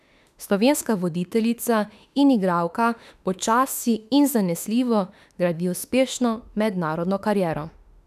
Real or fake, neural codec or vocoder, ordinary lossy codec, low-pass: fake; autoencoder, 48 kHz, 32 numbers a frame, DAC-VAE, trained on Japanese speech; none; 14.4 kHz